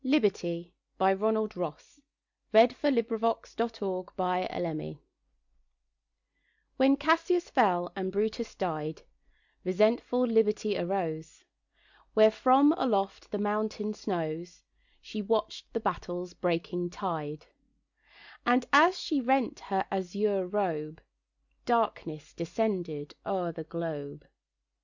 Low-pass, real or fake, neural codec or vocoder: 7.2 kHz; real; none